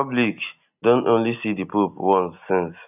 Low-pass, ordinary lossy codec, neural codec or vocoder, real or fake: 3.6 kHz; none; none; real